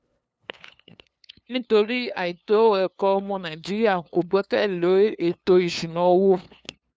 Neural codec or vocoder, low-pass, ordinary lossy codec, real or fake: codec, 16 kHz, 2 kbps, FunCodec, trained on LibriTTS, 25 frames a second; none; none; fake